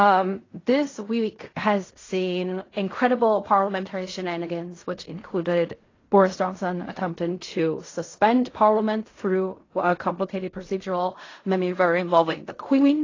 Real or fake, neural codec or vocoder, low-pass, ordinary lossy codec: fake; codec, 16 kHz in and 24 kHz out, 0.4 kbps, LongCat-Audio-Codec, fine tuned four codebook decoder; 7.2 kHz; AAC, 32 kbps